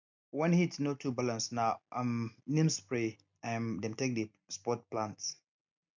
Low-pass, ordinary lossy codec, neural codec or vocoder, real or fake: 7.2 kHz; MP3, 64 kbps; none; real